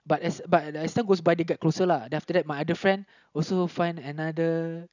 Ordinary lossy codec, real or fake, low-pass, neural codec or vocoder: none; real; 7.2 kHz; none